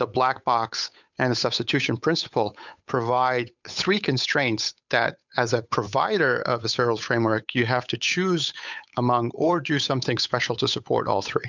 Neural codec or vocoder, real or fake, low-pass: codec, 16 kHz, 8 kbps, FunCodec, trained on Chinese and English, 25 frames a second; fake; 7.2 kHz